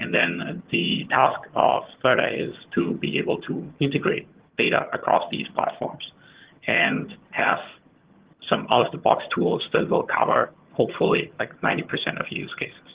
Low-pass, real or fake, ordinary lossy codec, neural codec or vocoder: 3.6 kHz; fake; Opus, 16 kbps; vocoder, 22.05 kHz, 80 mel bands, HiFi-GAN